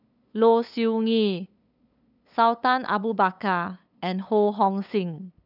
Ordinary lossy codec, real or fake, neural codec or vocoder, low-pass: none; fake; codec, 16 kHz, 8 kbps, FunCodec, trained on LibriTTS, 25 frames a second; 5.4 kHz